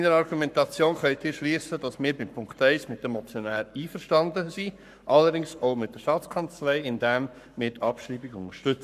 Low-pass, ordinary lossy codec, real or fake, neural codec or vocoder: 14.4 kHz; none; fake; codec, 44.1 kHz, 7.8 kbps, Pupu-Codec